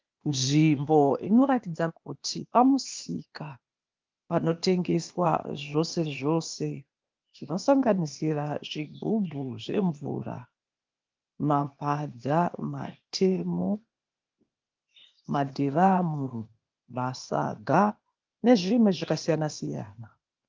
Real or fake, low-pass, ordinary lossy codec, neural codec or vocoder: fake; 7.2 kHz; Opus, 32 kbps; codec, 16 kHz, 0.8 kbps, ZipCodec